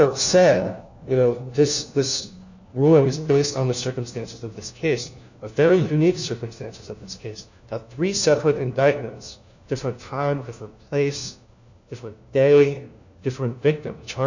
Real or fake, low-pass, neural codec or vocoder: fake; 7.2 kHz; codec, 16 kHz, 1 kbps, FunCodec, trained on LibriTTS, 50 frames a second